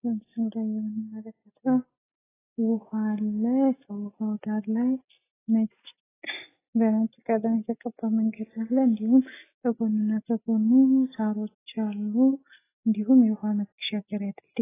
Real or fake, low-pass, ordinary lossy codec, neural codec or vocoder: real; 3.6 kHz; AAC, 16 kbps; none